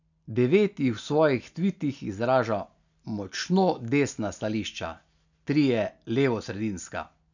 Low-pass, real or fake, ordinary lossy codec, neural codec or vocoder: 7.2 kHz; real; none; none